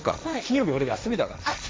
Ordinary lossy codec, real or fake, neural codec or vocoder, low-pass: none; fake; codec, 16 kHz, 1.1 kbps, Voila-Tokenizer; none